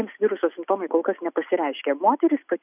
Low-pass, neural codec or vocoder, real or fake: 3.6 kHz; none; real